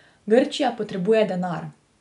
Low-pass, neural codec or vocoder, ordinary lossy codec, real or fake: 10.8 kHz; none; none; real